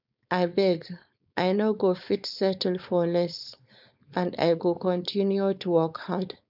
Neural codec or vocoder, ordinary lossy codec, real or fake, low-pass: codec, 16 kHz, 4.8 kbps, FACodec; none; fake; 5.4 kHz